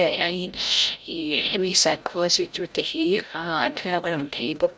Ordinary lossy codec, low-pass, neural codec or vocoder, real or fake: none; none; codec, 16 kHz, 0.5 kbps, FreqCodec, larger model; fake